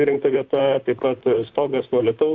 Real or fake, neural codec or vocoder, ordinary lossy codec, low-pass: fake; vocoder, 44.1 kHz, 128 mel bands, Pupu-Vocoder; Opus, 64 kbps; 7.2 kHz